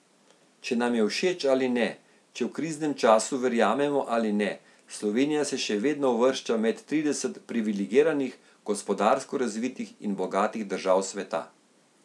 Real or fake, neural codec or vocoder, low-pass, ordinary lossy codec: real; none; none; none